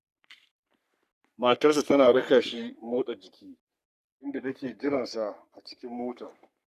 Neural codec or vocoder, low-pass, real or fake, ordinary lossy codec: codec, 44.1 kHz, 3.4 kbps, Pupu-Codec; 14.4 kHz; fake; none